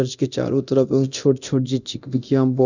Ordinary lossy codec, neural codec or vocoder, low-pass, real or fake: none; codec, 24 kHz, 0.9 kbps, DualCodec; 7.2 kHz; fake